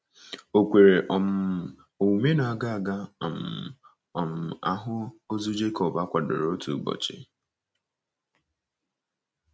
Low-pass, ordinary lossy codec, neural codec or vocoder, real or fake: none; none; none; real